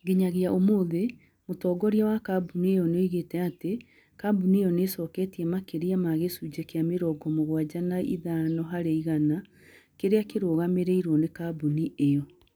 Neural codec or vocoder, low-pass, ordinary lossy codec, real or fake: none; 19.8 kHz; none; real